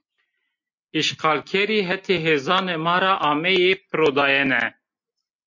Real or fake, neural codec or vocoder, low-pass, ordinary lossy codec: real; none; 7.2 kHz; MP3, 48 kbps